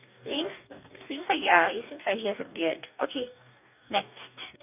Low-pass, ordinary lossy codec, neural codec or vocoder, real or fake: 3.6 kHz; none; codec, 44.1 kHz, 2.6 kbps, DAC; fake